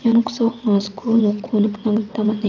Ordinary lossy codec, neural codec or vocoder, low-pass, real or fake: none; vocoder, 22.05 kHz, 80 mel bands, WaveNeXt; 7.2 kHz; fake